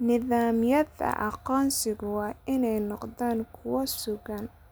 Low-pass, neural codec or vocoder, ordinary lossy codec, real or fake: none; none; none; real